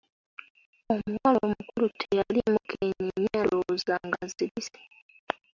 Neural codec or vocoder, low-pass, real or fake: none; 7.2 kHz; real